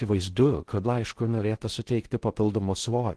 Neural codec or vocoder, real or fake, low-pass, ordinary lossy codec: codec, 16 kHz in and 24 kHz out, 0.6 kbps, FocalCodec, streaming, 2048 codes; fake; 10.8 kHz; Opus, 16 kbps